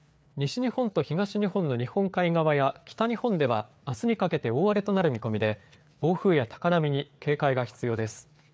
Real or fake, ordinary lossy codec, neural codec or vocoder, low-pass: fake; none; codec, 16 kHz, 4 kbps, FreqCodec, larger model; none